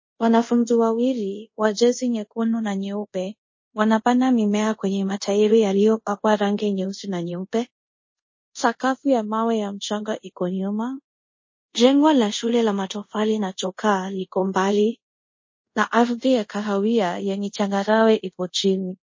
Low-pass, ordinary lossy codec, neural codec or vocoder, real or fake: 7.2 kHz; MP3, 32 kbps; codec, 24 kHz, 0.5 kbps, DualCodec; fake